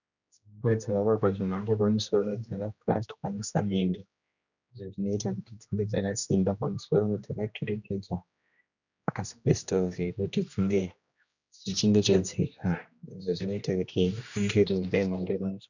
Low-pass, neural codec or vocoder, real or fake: 7.2 kHz; codec, 16 kHz, 1 kbps, X-Codec, HuBERT features, trained on general audio; fake